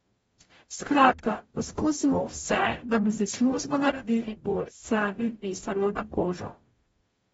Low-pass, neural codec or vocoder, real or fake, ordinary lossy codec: 19.8 kHz; codec, 44.1 kHz, 0.9 kbps, DAC; fake; AAC, 24 kbps